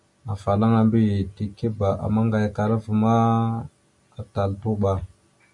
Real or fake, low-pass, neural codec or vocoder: real; 10.8 kHz; none